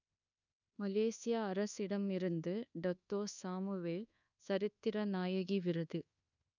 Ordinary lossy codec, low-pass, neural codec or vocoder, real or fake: none; 7.2 kHz; codec, 24 kHz, 1.2 kbps, DualCodec; fake